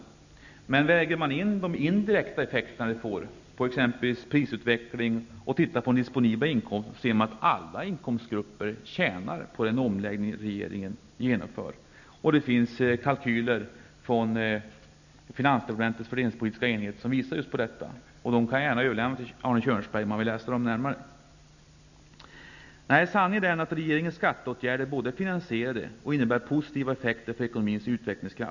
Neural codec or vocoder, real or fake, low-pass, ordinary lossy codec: none; real; 7.2 kHz; none